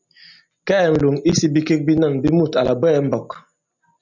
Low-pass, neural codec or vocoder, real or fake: 7.2 kHz; none; real